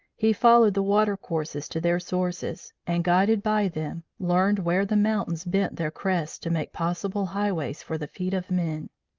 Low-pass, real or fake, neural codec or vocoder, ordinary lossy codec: 7.2 kHz; real; none; Opus, 24 kbps